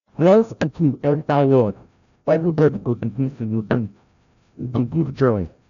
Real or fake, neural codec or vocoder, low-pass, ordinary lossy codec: fake; codec, 16 kHz, 0.5 kbps, FreqCodec, larger model; 7.2 kHz; none